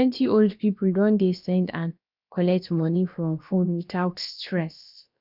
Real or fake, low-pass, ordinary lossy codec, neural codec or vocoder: fake; 5.4 kHz; none; codec, 16 kHz, about 1 kbps, DyCAST, with the encoder's durations